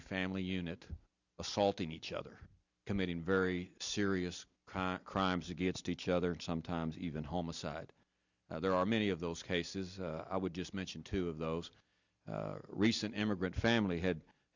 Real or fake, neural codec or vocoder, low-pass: real; none; 7.2 kHz